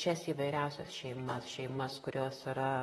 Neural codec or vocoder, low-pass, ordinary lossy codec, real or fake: vocoder, 44.1 kHz, 128 mel bands, Pupu-Vocoder; 19.8 kHz; AAC, 32 kbps; fake